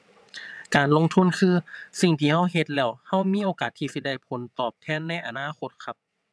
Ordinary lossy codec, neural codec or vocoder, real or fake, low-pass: none; vocoder, 22.05 kHz, 80 mel bands, WaveNeXt; fake; none